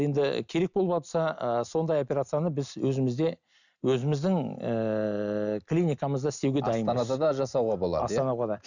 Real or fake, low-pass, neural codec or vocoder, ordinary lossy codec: real; 7.2 kHz; none; none